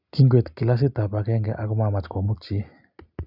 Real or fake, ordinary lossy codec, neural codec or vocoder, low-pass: real; none; none; 5.4 kHz